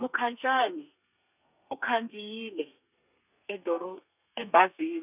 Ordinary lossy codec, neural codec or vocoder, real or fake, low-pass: none; codec, 32 kHz, 1.9 kbps, SNAC; fake; 3.6 kHz